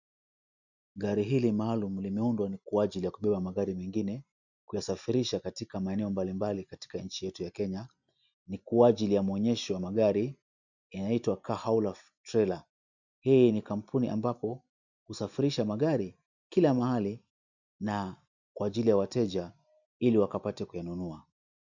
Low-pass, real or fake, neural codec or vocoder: 7.2 kHz; real; none